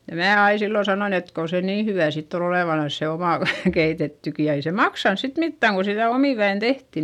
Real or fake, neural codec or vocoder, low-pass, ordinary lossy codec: real; none; 19.8 kHz; none